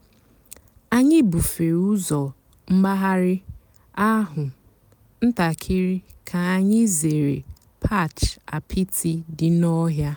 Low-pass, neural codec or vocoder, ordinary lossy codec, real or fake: none; none; none; real